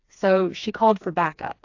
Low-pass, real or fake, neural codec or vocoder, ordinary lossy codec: 7.2 kHz; fake; codec, 16 kHz, 2 kbps, FreqCodec, smaller model; AAC, 48 kbps